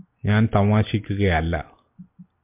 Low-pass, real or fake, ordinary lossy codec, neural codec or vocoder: 3.6 kHz; real; MP3, 32 kbps; none